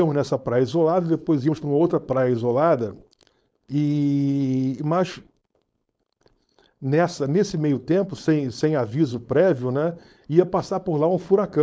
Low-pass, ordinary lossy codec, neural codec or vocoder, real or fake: none; none; codec, 16 kHz, 4.8 kbps, FACodec; fake